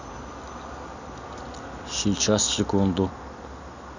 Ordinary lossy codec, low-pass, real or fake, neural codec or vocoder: none; 7.2 kHz; real; none